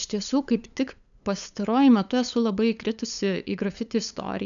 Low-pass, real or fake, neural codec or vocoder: 7.2 kHz; fake; codec, 16 kHz, 8 kbps, FunCodec, trained on LibriTTS, 25 frames a second